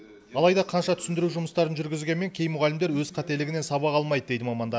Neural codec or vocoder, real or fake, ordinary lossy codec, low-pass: none; real; none; none